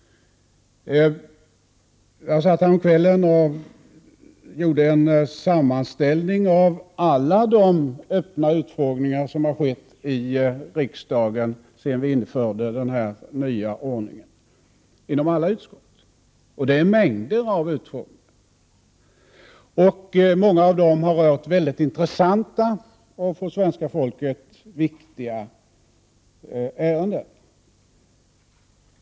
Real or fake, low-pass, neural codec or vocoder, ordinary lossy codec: real; none; none; none